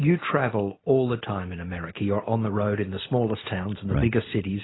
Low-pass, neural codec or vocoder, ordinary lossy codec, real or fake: 7.2 kHz; none; AAC, 16 kbps; real